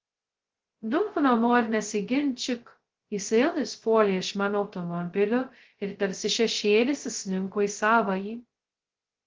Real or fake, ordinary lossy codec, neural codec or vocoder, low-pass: fake; Opus, 16 kbps; codec, 16 kHz, 0.2 kbps, FocalCodec; 7.2 kHz